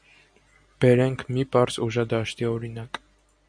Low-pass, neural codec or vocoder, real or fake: 9.9 kHz; none; real